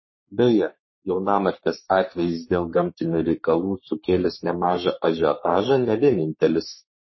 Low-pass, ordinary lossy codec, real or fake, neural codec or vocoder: 7.2 kHz; MP3, 24 kbps; fake; codec, 44.1 kHz, 3.4 kbps, Pupu-Codec